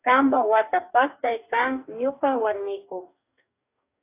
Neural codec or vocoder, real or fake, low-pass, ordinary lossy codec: codec, 44.1 kHz, 2.6 kbps, DAC; fake; 3.6 kHz; AAC, 24 kbps